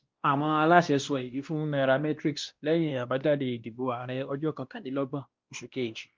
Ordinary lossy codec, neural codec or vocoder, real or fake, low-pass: Opus, 24 kbps; codec, 16 kHz, 1 kbps, X-Codec, WavLM features, trained on Multilingual LibriSpeech; fake; 7.2 kHz